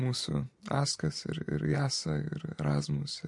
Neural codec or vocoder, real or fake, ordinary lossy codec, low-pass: none; real; MP3, 48 kbps; 10.8 kHz